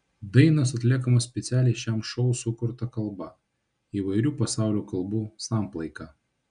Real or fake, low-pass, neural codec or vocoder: real; 9.9 kHz; none